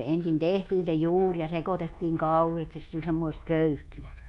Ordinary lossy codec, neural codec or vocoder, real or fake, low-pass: none; codec, 24 kHz, 1.2 kbps, DualCodec; fake; 10.8 kHz